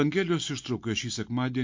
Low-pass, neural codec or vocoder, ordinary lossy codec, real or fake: 7.2 kHz; none; MP3, 48 kbps; real